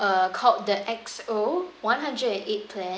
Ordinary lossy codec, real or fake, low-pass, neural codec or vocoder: none; real; none; none